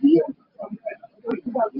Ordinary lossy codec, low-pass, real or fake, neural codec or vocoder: Opus, 24 kbps; 5.4 kHz; real; none